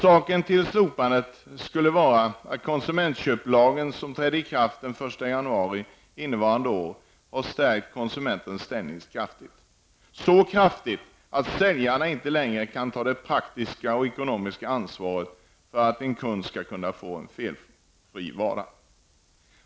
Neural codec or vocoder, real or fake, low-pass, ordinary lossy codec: none; real; none; none